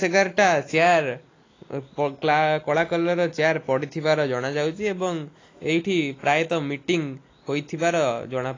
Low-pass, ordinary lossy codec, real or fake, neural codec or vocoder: 7.2 kHz; AAC, 32 kbps; real; none